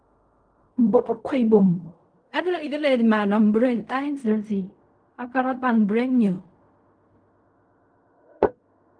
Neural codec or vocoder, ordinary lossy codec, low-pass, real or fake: codec, 16 kHz in and 24 kHz out, 0.4 kbps, LongCat-Audio-Codec, fine tuned four codebook decoder; Opus, 32 kbps; 9.9 kHz; fake